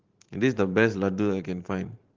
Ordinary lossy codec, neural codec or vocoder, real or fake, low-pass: Opus, 16 kbps; vocoder, 44.1 kHz, 128 mel bands every 512 samples, BigVGAN v2; fake; 7.2 kHz